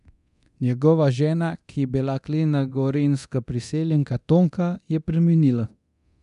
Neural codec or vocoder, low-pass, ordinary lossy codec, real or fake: codec, 24 kHz, 0.9 kbps, DualCodec; 10.8 kHz; none; fake